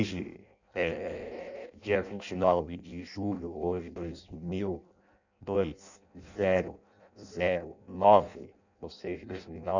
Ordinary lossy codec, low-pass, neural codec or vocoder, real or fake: none; 7.2 kHz; codec, 16 kHz in and 24 kHz out, 0.6 kbps, FireRedTTS-2 codec; fake